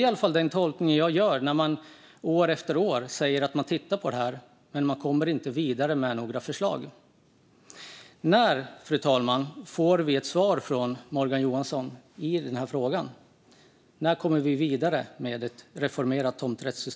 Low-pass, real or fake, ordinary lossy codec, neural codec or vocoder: none; real; none; none